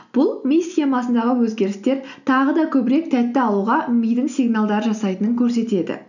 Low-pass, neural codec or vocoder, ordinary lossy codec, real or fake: 7.2 kHz; none; none; real